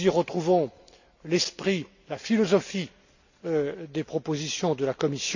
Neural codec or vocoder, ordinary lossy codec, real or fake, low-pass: none; none; real; 7.2 kHz